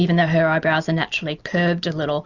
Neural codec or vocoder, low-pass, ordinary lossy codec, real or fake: none; 7.2 kHz; Opus, 64 kbps; real